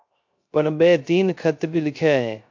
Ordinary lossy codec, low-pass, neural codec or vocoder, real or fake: MP3, 48 kbps; 7.2 kHz; codec, 16 kHz, 0.3 kbps, FocalCodec; fake